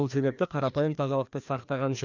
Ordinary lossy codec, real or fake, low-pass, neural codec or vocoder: none; fake; 7.2 kHz; codec, 16 kHz, 2 kbps, FreqCodec, larger model